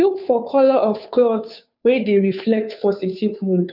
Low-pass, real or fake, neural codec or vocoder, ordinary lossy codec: 5.4 kHz; fake; codec, 16 kHz, 2 kbps, FunCodec, trained on Chinese and English, 25 frames a second; none